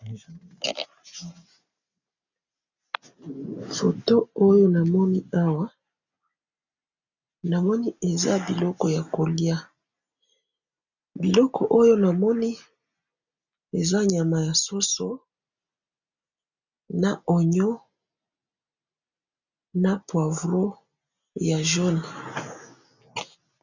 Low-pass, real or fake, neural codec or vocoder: 7.2 kHz; real; none